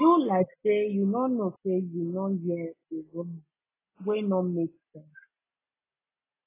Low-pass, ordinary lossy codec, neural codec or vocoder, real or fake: 3.6 kHz; AAC, 16 kbps; none; real